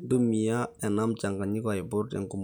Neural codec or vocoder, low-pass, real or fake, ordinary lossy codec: none; none; real; none